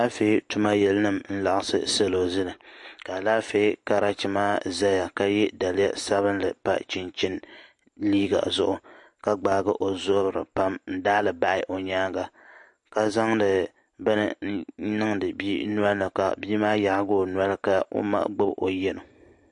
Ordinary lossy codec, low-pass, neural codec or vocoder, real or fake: MP3, 48 kbps; 10.8 kHz; none; real